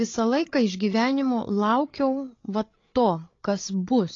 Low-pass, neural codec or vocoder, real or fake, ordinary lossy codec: 7.2 kHz; codec, 16 kHz, 8 kbps, FreqCodec, larger model; fake; AAC, 32 kbps